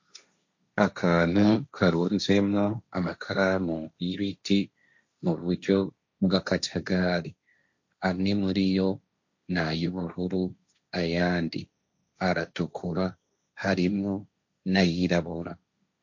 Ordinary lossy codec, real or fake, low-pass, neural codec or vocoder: MP3, 48 kbps; fake; 7.2 kHz; codec, 16 kHz, 1.1 kbps, Voila-Tokenizer